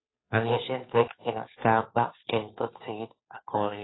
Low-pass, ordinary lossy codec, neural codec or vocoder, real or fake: 7.2 kHz; AAC, 16 kbps; codec, 16 kHz, 2 kbps, FunCodec, trained on Chinese and English, 25 frames a second; fake